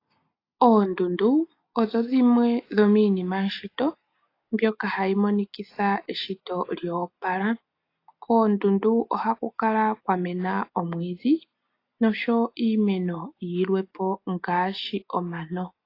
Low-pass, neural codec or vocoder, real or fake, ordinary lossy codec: 5.4 kHz; none; real; AAC, 32 kbps